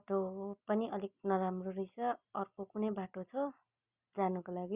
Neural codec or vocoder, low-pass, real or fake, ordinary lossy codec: none; 3.6 kHz; real; Opus, 64 kbps